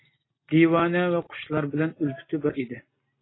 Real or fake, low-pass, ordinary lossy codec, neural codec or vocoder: real; 7.2 kHz; AAC, 16 kbps; none